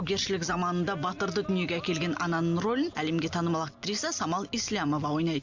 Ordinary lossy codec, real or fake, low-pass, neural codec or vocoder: Opus, 64 kbps; real; 7.2 kHz; none